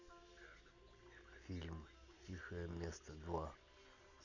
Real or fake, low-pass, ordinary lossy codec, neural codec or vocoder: real; 7.2 kHz; none; none